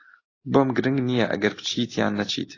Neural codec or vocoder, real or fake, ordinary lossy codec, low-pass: none; real; AAC, 32 kbps; 7.2 kHz